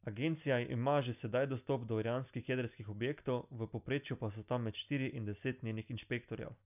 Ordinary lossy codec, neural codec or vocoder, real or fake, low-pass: none; none; real; 3.6 kHz